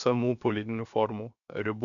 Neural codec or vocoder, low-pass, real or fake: codec, 16 kHz, 0.7 kbps, FocalCodec; 7.2 kHz; fake